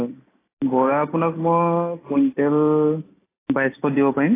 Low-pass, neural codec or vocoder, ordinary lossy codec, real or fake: 3.6 kHz; none; AAC, 16 kbps; real